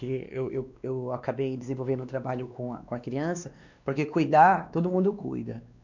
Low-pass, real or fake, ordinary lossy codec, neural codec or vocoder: 7.2 kHz; fake; none; codec, 16 kHz, 2 kbps, X-Codec, WavLM features, trained on Multilingual LibriSpeech